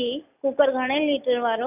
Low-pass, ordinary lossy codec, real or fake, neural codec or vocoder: 3.6 kHz; none; real; none